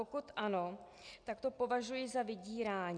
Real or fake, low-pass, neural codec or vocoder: real; 9.9 kHz; none